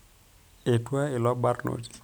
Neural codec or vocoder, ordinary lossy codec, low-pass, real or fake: none; none; none; real